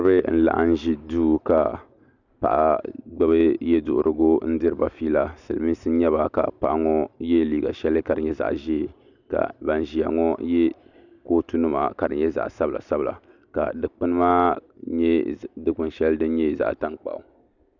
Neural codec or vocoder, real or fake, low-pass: none; real; 7.2 kHz